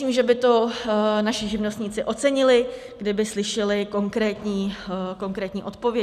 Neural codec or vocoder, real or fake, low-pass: none; real; 14.4 kHz